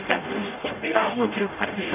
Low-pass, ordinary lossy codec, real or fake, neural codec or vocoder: 3.6 kHz; none; fake; codec, 44.1 kHz, 0.9 kbps, DAC